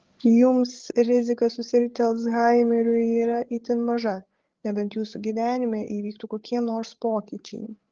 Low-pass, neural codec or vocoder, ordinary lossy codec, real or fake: 7.2 kHz; codec, 16 kHz, 8 kbps, FreqCodec, larger model; Opus, 16 kbps; fake